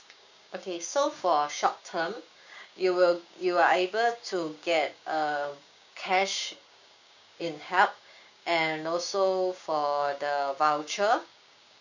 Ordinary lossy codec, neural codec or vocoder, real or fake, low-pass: none; codec, 16 kHz, 6 kbps, DAC; fake; 7.2 kHz